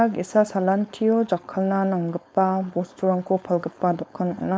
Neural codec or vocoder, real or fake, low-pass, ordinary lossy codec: codec, 16 kHz, 4.8 kbps, FACodec; fake; none; none